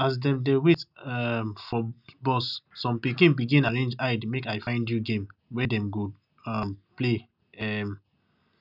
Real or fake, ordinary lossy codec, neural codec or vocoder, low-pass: real; none; none; 5.4 kHz